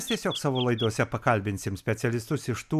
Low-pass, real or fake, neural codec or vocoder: 14.4 kHz; real; none